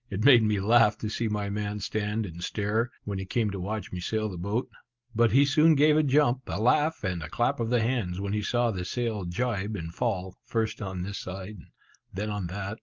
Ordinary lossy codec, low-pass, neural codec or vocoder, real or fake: Opus, 32 kbps; 7.2 kHz; none; real